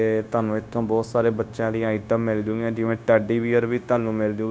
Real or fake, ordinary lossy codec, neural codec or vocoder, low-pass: fake; none; codec, 16 kHz, 0.9 kbps, LongCat-Audio-Codec; none